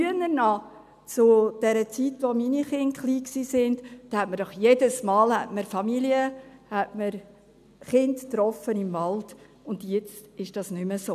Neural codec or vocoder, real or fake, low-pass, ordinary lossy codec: none; real; 14.4 kHz; none